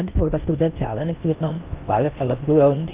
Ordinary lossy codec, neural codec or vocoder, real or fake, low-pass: Opus, 32 kbps; codec, 16 kHz in and 24 kHz out, 0.6 kbps, FocalCodec, streaming, 4096 codes; fake; 3.6 kHz